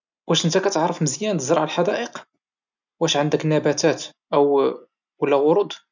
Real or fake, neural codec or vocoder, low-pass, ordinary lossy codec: real; none; 7.2 kHz; none